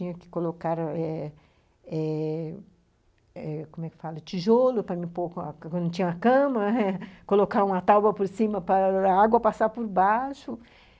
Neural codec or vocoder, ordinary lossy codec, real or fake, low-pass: none; none; real; none